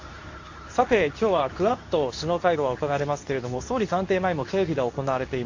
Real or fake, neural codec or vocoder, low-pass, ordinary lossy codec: fake; codec, 24 kHz, 0.9 kbps, WavTokenizer, medium speech release version 2; 7.2 kHz; AAC, 48 kbps